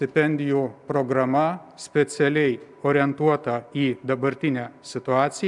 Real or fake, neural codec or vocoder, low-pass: real; none; 10.8 kHz